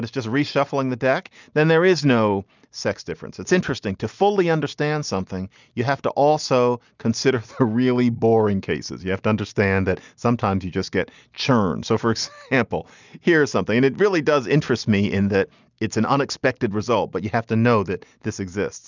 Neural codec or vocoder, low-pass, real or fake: none; 7.2 kHz; real